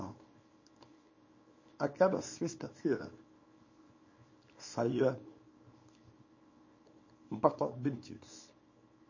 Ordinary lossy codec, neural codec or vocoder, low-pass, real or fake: MP3, 32 kbps; codec, 24 kHz, 0.9 kbps, WavTokenizer, small release; 7.2 kHz; fake